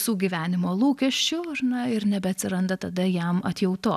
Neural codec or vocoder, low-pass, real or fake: none; 14.4 kHz; real